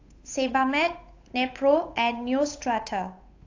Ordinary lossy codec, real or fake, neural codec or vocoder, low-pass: AAC, 48 kbps; fake; codec, 16 kHz, 8 kbps, FunCodec, trained on Chinese and English, 25 frames a second; 7.2 kHz